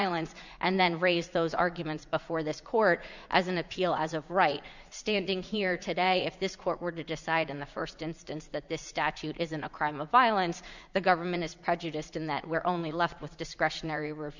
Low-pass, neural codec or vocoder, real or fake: 7.2 kHz; vocoder, 44.1 kHz, 80 mel bands, Vocos; fake